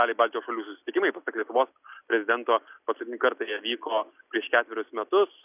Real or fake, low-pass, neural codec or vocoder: real; 3.6 kHz; none